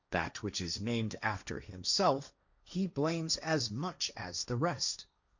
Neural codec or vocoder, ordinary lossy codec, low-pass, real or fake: codec, 16 kHz, 1.1 kbps, Voila-Tokenizer; Opus, 64 kbps; 7.2 kHz; fake